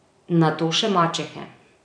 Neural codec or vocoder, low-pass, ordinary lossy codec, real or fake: vocoder, 44.1 kHz, 128 mel bands every 256 samples, BigVGAN v2; 9.9 kHz; none; fake